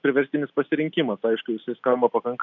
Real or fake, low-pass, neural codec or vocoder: real; 7.2 kHz; none